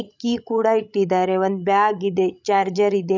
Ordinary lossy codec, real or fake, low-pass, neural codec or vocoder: none; fake; 7.2 kHz; codec, 16 kHz, 16 kbps, FreqCodec, larger model